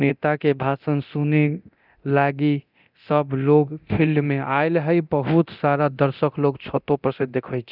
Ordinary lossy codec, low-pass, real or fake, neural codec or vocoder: none; 5.4 kHz; fake; codec, 24 kHz, 0.9 kbps, DualCodec